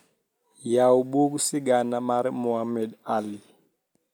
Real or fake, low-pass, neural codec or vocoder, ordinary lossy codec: real; none; none; none